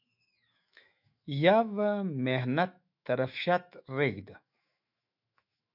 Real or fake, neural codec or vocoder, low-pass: fake; autoencoder, 48 kHz, 128 numbers a frame, DAC-VAE, trained on Japanese speech; 5.4 kHz